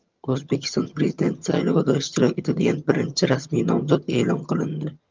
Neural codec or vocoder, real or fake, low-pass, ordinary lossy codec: vocoder, 22.05 kHz, 80 mel bands, HiFi-GAN; fake; 7.2 kHz; Opus, 24 kbps